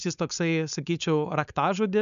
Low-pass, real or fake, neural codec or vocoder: 7.2 kHz; fake; codec, 16 kHz, 4.8 kbps, FACodec